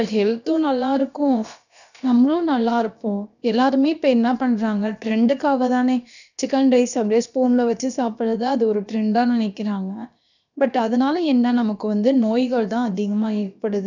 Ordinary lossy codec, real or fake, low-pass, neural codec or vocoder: none; fake; 7.2 kHz; codec, 16 kHz, about 1 kbps, DyCAST, with the encoder's durations